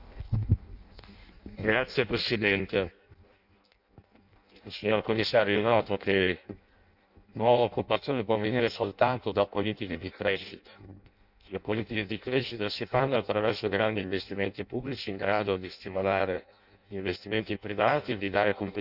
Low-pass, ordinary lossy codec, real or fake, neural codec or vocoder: 5.4 kHz; none; fake; codec, 16 kHz in and 24 kHz out, 0.6 kbps, FireRedTTS-2 codec